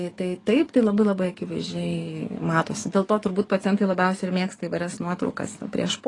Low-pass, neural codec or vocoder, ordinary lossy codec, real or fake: 10.8 kHz; codec, 44.1 kHz, 7.8 kbps, DAC; AAC, 32 kbps; fake